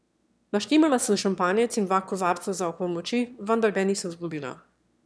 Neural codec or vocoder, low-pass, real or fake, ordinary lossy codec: autoencoder, 22.05 kHz, a latent of 192 numbers a frame, VITS, trained on one speaker; none; fake; none